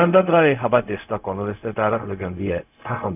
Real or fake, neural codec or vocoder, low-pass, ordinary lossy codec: fake; codec, 16 kHz, 0.4 kbps, LongCat-Audio-Codec; 3.6 kHz; none